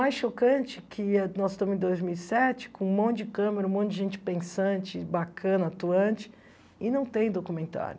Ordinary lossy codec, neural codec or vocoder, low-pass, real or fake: none; none; none; real